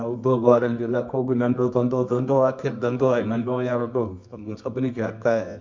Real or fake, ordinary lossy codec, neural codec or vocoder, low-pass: fake; MP3, 64 kbps; codec, 24 kHz, 0.9 kbps, WavTokenizer, medium music audio release; 7.2 kHz